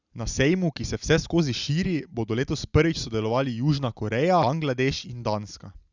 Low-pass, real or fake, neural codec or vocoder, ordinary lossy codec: 7.2 kHz; real; none; Opus, 64 kbps